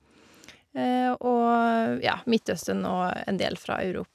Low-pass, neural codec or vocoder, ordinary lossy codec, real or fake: 14.4 kHz; none; none; real